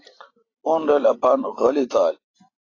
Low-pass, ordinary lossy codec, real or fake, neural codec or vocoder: 7.2 kHz; AAC, 32 kbps; real; none